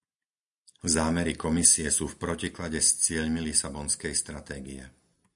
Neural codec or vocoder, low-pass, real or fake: none; 10.8 kHz; real